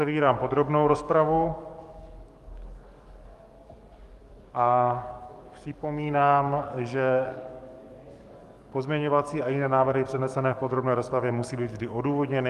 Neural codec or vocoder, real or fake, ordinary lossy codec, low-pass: codec, 44.1 kHz, 7.8 kbps, DAC; fake; Opus, 32 kbps; 14.4 kHz